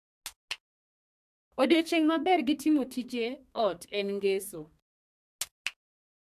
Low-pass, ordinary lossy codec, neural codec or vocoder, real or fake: 14.4 kHz; AAC, 96 kbps; codec, 32 kHz, 1.9 kbps, SNAC; fake